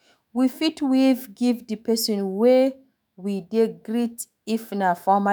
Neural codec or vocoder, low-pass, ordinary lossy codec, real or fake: autoencoder, 48 kHz, 128 numbers a frame, DAC-VAE, trained on Japanese speech; none; none; fake